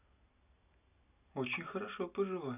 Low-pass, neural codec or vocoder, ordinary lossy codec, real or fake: 3.6 kHz; none; none; real